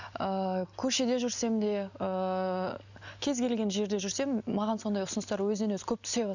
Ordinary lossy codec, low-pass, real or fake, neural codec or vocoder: none; 7.2 kHz; real; none